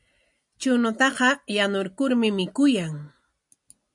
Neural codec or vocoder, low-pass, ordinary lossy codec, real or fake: none; 10.8 kHz; MP3, 96 kbps; real